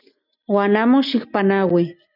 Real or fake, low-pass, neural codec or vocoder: real; 5.4 kHz; none